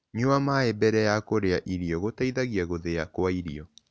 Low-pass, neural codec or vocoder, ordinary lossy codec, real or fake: none; none; none; real